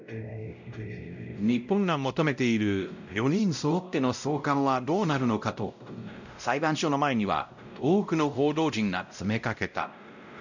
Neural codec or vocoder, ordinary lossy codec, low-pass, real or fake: codec, 16 kHz, 0.5 kbps, X-Codec, WavLM features, trained on Multilingual LibriSpeech; none; 7.2 kHz; fake